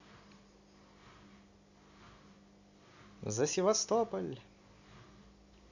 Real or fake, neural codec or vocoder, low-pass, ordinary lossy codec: real; none; 7.2 kHz; none